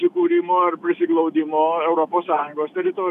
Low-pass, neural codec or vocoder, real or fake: 14.4 kHz; autoencoder, 48 kHz, 128 numbers a frame, DAC-VAE, trained on Japanese speech; fake